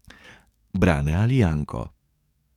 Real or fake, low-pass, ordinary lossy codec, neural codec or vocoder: fake; 19.8 kHz; none; codec, 44.1 kHz, 7.8 kbps, DAC